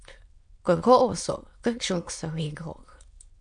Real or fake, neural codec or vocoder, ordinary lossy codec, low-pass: fake; autoencoder, 22.05 kHz, a latent of 192 numbers a frame, VITS, trained on many speakers; MP3, 64 kbps; 9.9 kHz